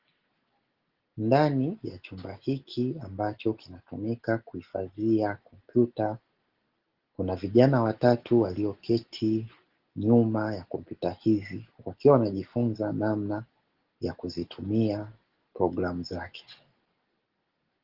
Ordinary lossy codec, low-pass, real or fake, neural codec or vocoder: Opus, 16 kbps; 5.4 kHz; real; none